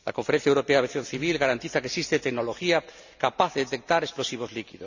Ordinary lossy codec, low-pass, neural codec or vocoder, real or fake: none; 7.2 kHz; none; real